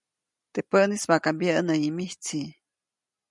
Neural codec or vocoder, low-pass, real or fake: none; 10.8 kHz; real